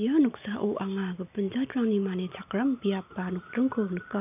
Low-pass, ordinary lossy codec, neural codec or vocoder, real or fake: 3.6 kHz; none; none; real